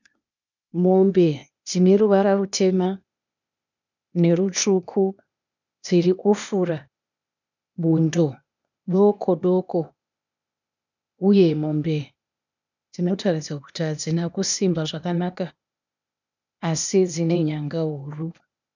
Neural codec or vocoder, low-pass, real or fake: codec, 16 kHz, 0.8 kbps, ZipCodec; 7.2 kHz; fake